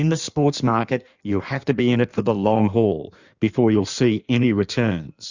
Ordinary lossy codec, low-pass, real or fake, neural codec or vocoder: Opus, 64 kbps; 7.2 kHz; fake; codec, 16 kHz in and 24 kHz out, 1.1 kbps, FireRedTTS-2 codec